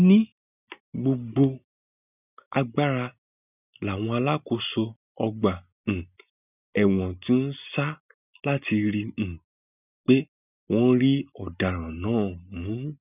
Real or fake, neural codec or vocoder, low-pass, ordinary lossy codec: real; none; 3.6 kHz; none